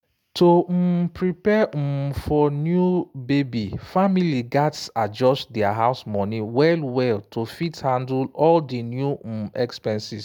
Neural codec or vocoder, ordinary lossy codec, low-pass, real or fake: none; none; 19.8 kHz; real